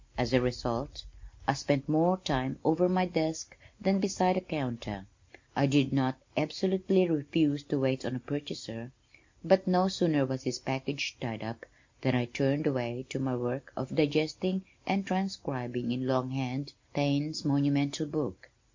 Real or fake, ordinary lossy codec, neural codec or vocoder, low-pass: real; MP3, 48 kbps; none; 7.2 kHz